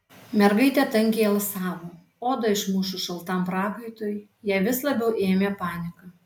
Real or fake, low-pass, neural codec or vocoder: real; 19.8 kHz; none